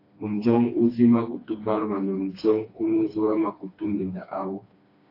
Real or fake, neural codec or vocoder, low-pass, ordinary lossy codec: fake; codec, 16 kHz, 2 kbps, FreqCodec, smaller model; 5.4 kHz; AAC, 32 kbps